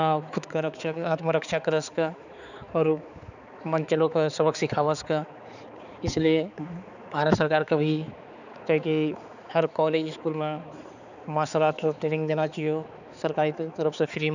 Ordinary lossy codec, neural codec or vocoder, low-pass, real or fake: none; codec, 16 kHz, 4 kbps, X-Codec, HuBERT features, trained on balanced general audio; 7.2 kHz; fake